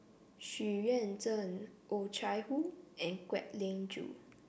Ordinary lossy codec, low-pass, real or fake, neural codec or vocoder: none; none; real; none